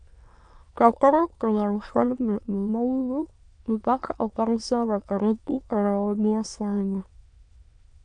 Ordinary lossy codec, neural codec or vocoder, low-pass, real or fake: AAC, 64 kbps; autoencoder, 22.05 kHz, a latent of 192 numbers a frame, VITS, trained on many speakers; 9.9 kHz; fake